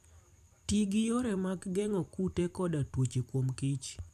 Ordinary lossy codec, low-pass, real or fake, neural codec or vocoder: none; 14.4 kHz; fake; vocoder, 44.1 kHz, 128 mel bands every 512 samples, BigVGAN v2